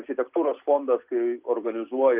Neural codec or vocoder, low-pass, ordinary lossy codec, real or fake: none; 3.6 kHz; Opus, 24 kbps; real